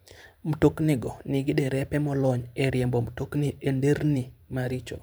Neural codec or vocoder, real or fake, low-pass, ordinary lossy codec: vocoder, 44.1 kHz, 128 mel bands every 512 samples, BigVGAN v2; fake; none; none